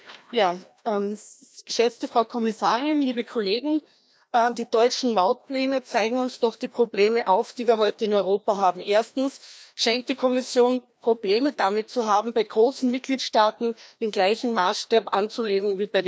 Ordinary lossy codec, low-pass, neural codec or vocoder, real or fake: none; none; codec, 16 kHz, 1 kbps, FreqCodec, larger model; fake